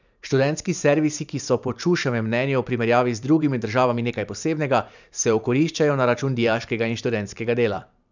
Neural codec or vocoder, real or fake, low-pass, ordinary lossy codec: vocoder, 44.1 kHz, 128 mel bands every 512 samples, BigVGAN v2; fake; 7.2 kHz; none